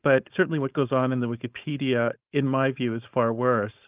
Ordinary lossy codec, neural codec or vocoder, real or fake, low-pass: Opus, 32 kbps; codec, 16 kHz, 4.8 kbps, FACodec; fake; 3.6 kHz